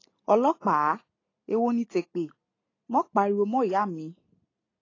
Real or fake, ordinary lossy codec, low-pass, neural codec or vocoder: real; AAC, 32 kbps; 7.2 kHz; none